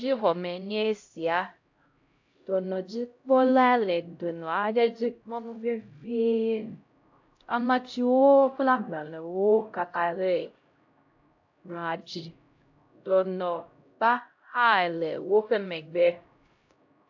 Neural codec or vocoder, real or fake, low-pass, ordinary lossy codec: codec, 16 kHz, 0.5 kbps, X-Codec, HuBERT features, trained on LibriSpeech; fake; 7.2 kHz; AAC, 48 kbps